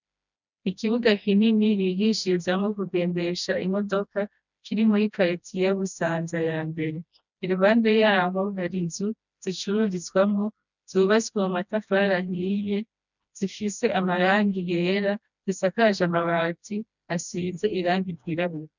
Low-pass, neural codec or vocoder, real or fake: 7.2 kHz; codec, 16 kHz, 1 kbps, FreqCodec, smaller model; fake